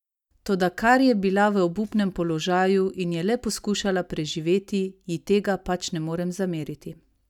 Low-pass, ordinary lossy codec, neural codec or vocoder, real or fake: 19.8 kHz; none; none; real